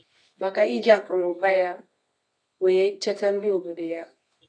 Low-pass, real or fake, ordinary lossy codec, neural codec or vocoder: 9.9 kHz; fake; AAC, 48 kbps; codec, 24 kHz, 0.9 kbps, WavTokenizer, medium music audio release